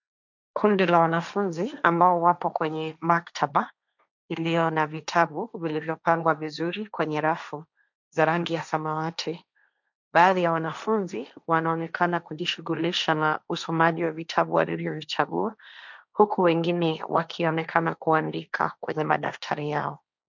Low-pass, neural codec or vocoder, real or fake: 7.2 kHz; codec, 16 kHz, 1.1 kbps, Voila-Tokenizer; fake